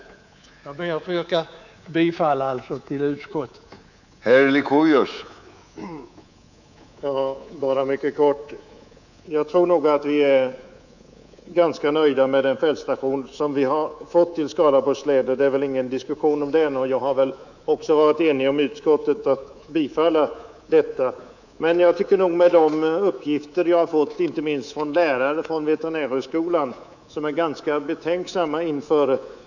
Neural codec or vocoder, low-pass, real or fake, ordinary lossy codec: codec, 24 kHz, 3.1 kbps, DualCodec; 7.2 kHz; fake; none